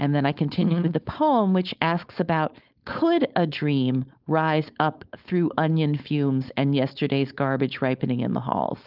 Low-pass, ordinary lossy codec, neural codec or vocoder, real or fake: 5.4 kHz; Opus, 24 kbps; codec, 16 kHz, 4.8 kbps, FACodec; fake